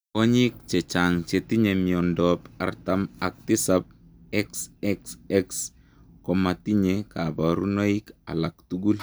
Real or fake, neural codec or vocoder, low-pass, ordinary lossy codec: real; none; none; none